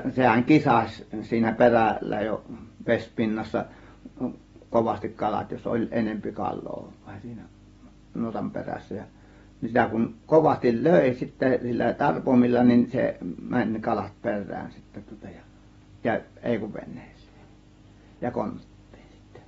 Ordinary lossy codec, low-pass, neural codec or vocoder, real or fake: AAC, 24 kbps; 19.8 kHz; none; real